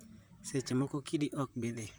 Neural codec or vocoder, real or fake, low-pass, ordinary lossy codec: vocoder, 44.1 kHz, 128 mel bands, Pupu-Vocoder; fake; none; none